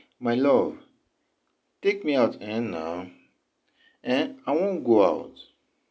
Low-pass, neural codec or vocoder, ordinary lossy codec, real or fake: none; none; none; real